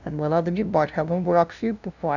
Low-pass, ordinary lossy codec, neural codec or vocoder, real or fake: 7.2 kHz; none; codec, 16 kHz, 0.5 kbps, FunCodec, trained on LibriTTS, 25 frames a second; fake